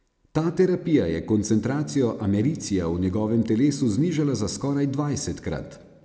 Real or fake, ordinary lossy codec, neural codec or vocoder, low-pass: real; none; none; none